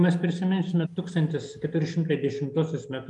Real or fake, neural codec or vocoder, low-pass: real; none; 10.8 kHz